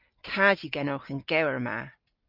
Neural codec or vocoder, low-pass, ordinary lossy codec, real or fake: none; 5.4 kHz; Opus, 24 kbps; real